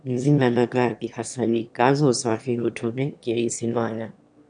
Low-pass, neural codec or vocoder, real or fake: 9.9 kHz; autoencoder, 22.05 kHz, a latent of 192 numbers a frame, VITS, trained on one speaker; fake